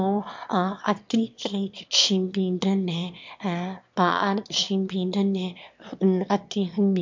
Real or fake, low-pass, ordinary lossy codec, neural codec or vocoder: fake; 7.2 kHz; MP3, 64 kbps; autoencoder, 22.05 kHz, a latent of 192 numbers a frame, VITS, trained on one speaker